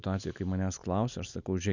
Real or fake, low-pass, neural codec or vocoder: real; 7.2 kHz; none